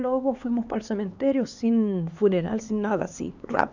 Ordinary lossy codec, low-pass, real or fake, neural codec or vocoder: none; 7.2 kHz; fake; codec, 16 kHz, 4 kbps, X-Codec, HuBERT features, trained on LibriSpeech